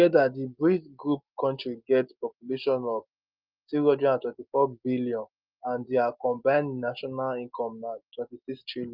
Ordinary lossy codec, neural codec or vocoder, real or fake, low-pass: Opus, 32 kbps; none; real; 5.4 kHz